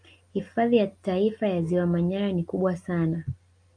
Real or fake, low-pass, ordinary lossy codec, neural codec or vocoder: real; 9.9 kHz; MP3, 64 kbps; none